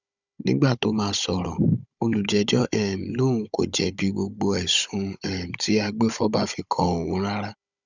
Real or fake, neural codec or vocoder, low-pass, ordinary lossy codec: fake; codec, 16 kHz, 16 kbps, FunCodec, trained on Chinese and English, 50 frames a second; 7.2 kHz; none